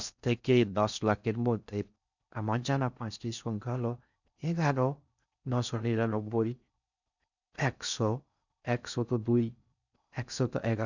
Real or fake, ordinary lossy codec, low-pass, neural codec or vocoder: fake; none; 7.2 kHz; codec, 16 kHz in and 24 kHz out, 0.6 kbps, FocalCodec, streaming, 4096 codes